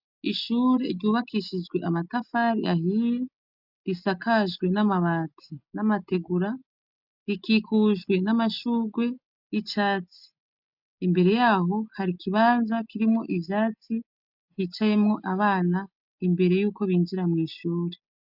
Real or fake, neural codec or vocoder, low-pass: real; none; 5.4 kHz